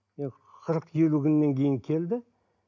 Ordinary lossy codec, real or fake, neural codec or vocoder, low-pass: none; real; none; 7.2 kHz